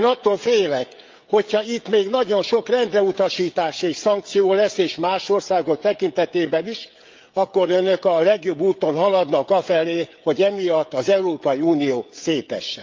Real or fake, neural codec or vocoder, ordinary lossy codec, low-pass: fake; codec, 16 kHz, 16 kbps, FreqCodec, smaller model; Opus, 32 kbps; 7.2 kHz